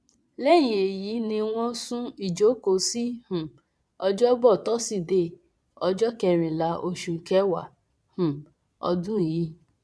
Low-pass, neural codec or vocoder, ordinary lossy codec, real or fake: none; vocoder, 22.05 kHz, 80 mel bands, Vocos; none; fake